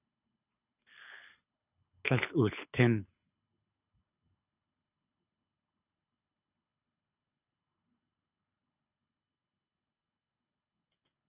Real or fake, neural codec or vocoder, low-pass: fake; codec, 24 kHz, 6 kbps, HILCodec; 3.6 kHz